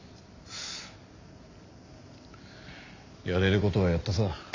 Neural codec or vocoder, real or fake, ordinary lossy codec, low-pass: none; real; AAC, 48 kbps; 7.2 kHz